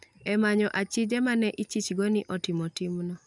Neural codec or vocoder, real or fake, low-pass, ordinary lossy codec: none; real; 10.8 kHz; none